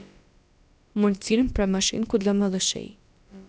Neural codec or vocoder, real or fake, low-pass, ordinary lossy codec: codec, 16 kHz, about 1 kbps, DyCAST, with the encoder's durations; fake; none; none